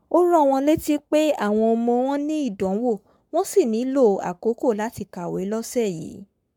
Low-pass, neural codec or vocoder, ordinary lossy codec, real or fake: 19.8 kHz; codec, 44.1 kHz, 7.8 kbps, Pupu-Codec; MP3, 96 kbps; fake